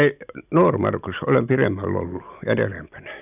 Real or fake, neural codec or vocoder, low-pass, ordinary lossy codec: real; none; 3.6 kHz; none